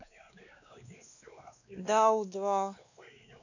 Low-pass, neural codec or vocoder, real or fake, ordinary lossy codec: 7.2 kHz; codec, 16 kHz, 2 kbps, X-Codec, WavLM features, trained on Multilingual LibriSpeech; fake; none